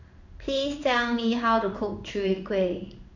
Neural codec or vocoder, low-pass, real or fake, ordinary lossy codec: codec, 16 kHz in and 24 kHz out, 1 kbps, XY-Tokenizer; 7.2 kHz; fake; none